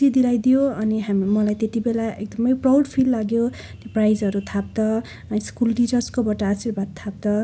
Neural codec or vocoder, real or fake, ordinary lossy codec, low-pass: none; real; none; none